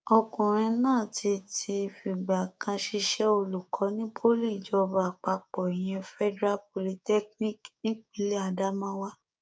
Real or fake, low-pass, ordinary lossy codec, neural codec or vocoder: fake; none; none; codec, 16 kHz, 6 kbps, DAC